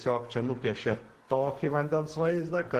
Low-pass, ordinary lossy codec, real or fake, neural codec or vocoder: 14.4 kHz; Opus, 16 kbps; fake; codec, 44.1 kHz, 2.6 kbps, SNAC